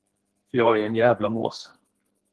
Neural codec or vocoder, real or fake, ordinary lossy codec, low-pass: codec, 32 kHz, 1.9 kbps, SNAC; fake; Opus, 16 kbps; 10.8 kHz